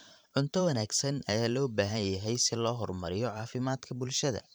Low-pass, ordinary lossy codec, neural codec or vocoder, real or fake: none; none; vocoder, 44.1 kHz, 128 mel bands every 512 samples, BigVGAN v2; fake